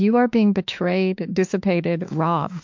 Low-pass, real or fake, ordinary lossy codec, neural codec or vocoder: 7.2 kHz; fake; MP3, 64 kbps; autoencoder, 48 kHz, 32 numbers a frame, DAC-VAE, trained on Japanese speech